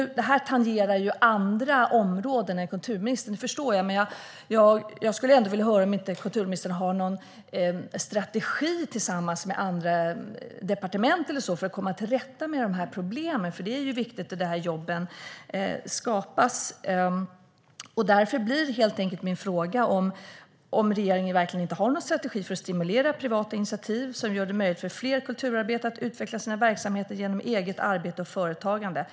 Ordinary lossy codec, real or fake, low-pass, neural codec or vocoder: none; real; none; none